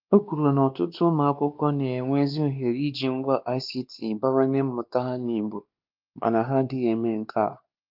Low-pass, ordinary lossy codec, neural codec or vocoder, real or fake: 5.4 kHz; Opus, 24 kbps; codec, 16 kHz, 2 kbps, X-Codec, WavLM features, trained on Multilingual LibriSpeech; fake